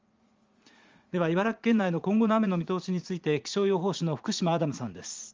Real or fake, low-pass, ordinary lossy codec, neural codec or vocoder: fake; 7.2 kHz; Opus, 32 kbps; vocoder, 44.1 kHz, 80 mel bands, Vocos